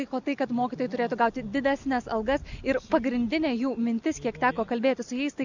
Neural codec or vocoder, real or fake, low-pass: none; real; 7.2 kHz